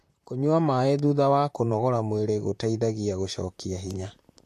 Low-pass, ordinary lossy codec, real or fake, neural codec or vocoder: 14.4 kHz; AAC, 64 kbps; fake; vocoder, 44.1 kHz, 128 mel bands, Pupu-Vocoder